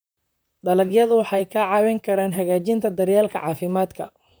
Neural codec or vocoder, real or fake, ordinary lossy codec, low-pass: vocoder, 44.1 kHz, 128 mel bands, Pupu-Vocoder; fake; none; none